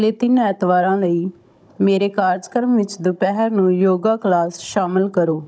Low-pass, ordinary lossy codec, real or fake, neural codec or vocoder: none; none; fake; codec, 16 kHz, 16 kbps, FunCodec, trained on Chinese and English, 50 frames a second